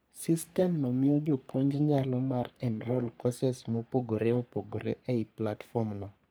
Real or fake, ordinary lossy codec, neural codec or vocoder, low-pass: fake; none; codec, 44.1 kHz, 3.4 kbps, Pupu-Codec; none